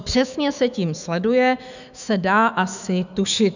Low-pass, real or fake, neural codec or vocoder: 7.2 kHz; fake; autoencoder, 48 kHz, 128 numbers a frame, DAC-VAE, trained on Japanese speech